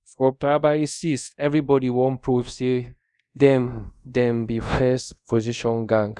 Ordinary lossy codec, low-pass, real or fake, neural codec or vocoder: none; 10.8 kHz; fake; codec, 24 kHz, 0.5 kbps, DualCodec